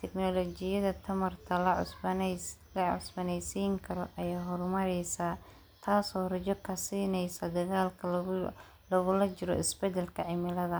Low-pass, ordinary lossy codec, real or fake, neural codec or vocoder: none; none; real; none